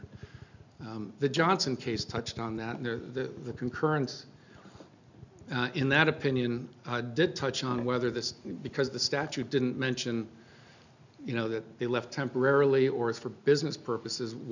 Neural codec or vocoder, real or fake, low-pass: none; real; 7.2 kHz